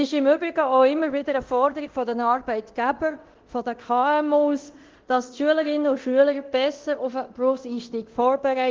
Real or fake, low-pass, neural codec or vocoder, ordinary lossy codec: fake; 7.2 kHz; codec, 24 kHz, 0.9 kbps, DualCodec; Opus, 16 kbps